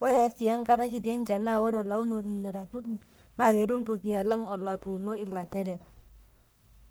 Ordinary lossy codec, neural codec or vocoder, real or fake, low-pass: none; codec, 44.1 kHz, 1.7 kbps, Pupu-Codec; fake; none